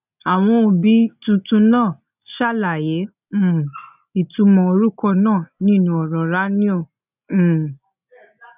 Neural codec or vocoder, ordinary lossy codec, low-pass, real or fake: none; none; 3.6 kHz; real